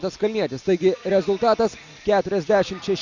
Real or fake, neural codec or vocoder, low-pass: real; none; 7.2 kHz